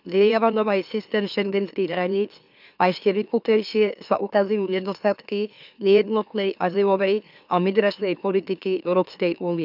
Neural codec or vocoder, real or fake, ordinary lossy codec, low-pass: autoencoder, 44.1 kHz, a latent of 192 numbers a frame, MeloTTS; fake; none; 5.4 kHz